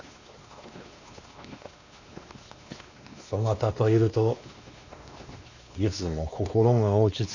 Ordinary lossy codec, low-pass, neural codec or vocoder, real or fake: none; 7.2 kHz; codec, 16 kHz, 2 kbps, X-Codec, WavLM features, trained on Multilingual LibriSpeech; fake